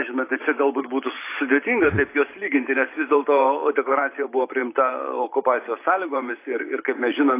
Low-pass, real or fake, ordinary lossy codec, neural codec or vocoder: 3.6 kHz; fake; AAC, 24 kbps; vocoder, 44.1 kHz, 128 mel bands every 512 samples, BigVGAN v2